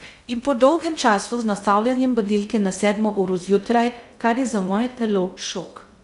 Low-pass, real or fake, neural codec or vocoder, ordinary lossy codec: 10.8 kHz; fake; codec, 16 kHz in and 24 kHz out, 0.6 kbps, FocalCodec, streaming, 2048 codes; none